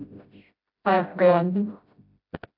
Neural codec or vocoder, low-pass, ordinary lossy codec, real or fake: codec, 16 kHz, 0.5 kbps, FreqCodec, smaller model; 5.4 kHz; AAC, 48 kbps; fake